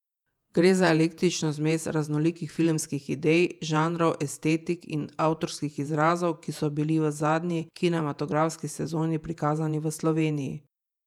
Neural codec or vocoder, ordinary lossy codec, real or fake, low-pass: vocoder, 48 kHz, 128 mel bands, Vocos; none; fake; 19.8 kHz